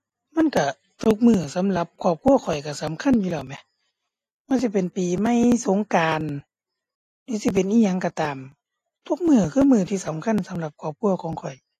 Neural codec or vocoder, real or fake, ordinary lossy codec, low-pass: none; real; AAC, 48 kbps; 14.4 kHz